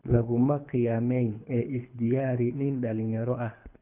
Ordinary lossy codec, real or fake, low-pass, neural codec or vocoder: none; fake; 3.6 kHz; codec, 24 kHz, 3 kbps, HILCodec